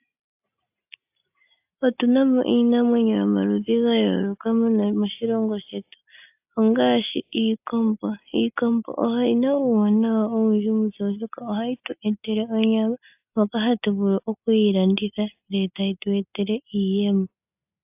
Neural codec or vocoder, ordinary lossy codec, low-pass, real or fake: none; AAC, 32 kbps; 3.6 kHz; real